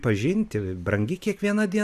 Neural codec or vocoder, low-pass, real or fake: none; 14.4 kHz; real